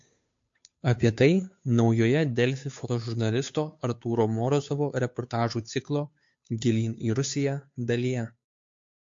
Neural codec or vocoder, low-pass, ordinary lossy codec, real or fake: codec, 16 kHz, 2 kbps, FunCodec, trained on Chinese and English, 25 frames a second; 7.2 kHz; MP3, 48 kbps; fake